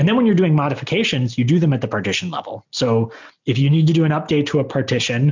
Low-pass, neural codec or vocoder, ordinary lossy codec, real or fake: 7.2 kHz; none; MP3, 64 kbps; real